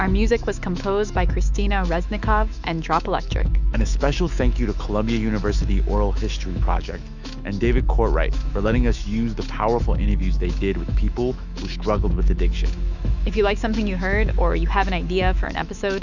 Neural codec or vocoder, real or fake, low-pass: autoencoder, 48 kHz, 128 numbers a frame, DAC-VAE, trained on Japanese speech; fake; 7.2 kHz